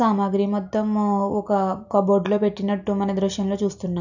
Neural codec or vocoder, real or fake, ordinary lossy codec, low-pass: none; real; none; 7.2 kHz